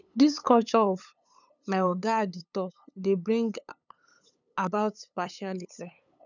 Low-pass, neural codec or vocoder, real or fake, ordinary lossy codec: 7.2 kHz; codec, 16 kHz, 8 kbps, FunCodec, trained on LibriTTS, 25 frames a second; fake; none